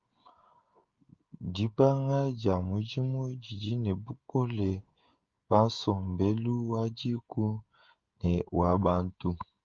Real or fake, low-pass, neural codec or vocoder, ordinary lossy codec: fake; 7.2 kHz; codec, 16 kHz, 16 kbps, FreqCodec, smaller model; Opus, 32 kbps